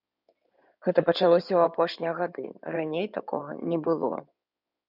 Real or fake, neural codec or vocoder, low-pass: fake; codec, 16 kHz in and 24 kHz out, 2.2 kbps, FireRedTTS-2 codec; 5.4 kHz